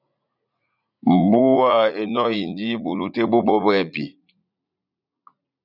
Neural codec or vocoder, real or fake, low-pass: vocoder, 44.1 kHz, 80 mel bands, Vocos; fake; 5.4 kHz